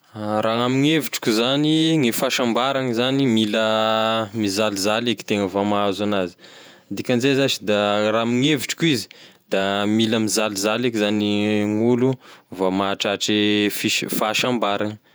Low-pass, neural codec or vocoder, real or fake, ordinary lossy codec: none; vocoder, 48 kHz, 128 mel bands, Vocos; fake; none